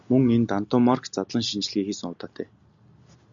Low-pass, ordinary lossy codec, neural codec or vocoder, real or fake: 7.2 kHz; MP3, 64 kbps; none; real